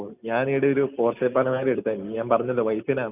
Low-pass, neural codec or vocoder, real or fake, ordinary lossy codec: 3.6 kHz; none; real; none